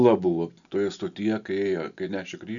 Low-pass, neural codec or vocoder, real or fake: 7.2 kHz; none; real